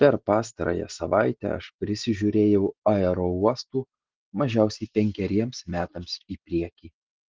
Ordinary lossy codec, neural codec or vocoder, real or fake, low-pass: Opus, 16 kbps; none; real; 7.2 kHz